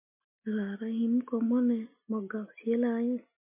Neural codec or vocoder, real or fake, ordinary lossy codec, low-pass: none; real; MP3, 32 kbps; 3.6 kHz